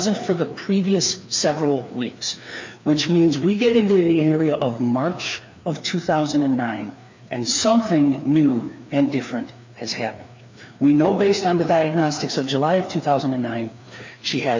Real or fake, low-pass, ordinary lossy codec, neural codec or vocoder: fake; 7.2 kHz; MP3, 64 kbps; codec, 16 kHz, 2 kbps, FreqCodec, larger model